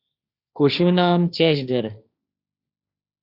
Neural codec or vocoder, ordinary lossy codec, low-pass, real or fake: codec, 16 kHz, 1.1 kbps, Voila-Tokenizer; Opus, 64 kbps; 5.4 kHz; fake